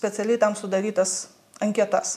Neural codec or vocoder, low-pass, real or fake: none; 14.4 kHz; real